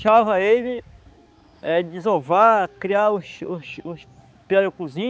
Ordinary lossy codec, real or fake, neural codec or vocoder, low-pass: none; fake; codec, 16 kHz, 4 kbps, X-Codec, HuBERT features, trained on balanced general audio; none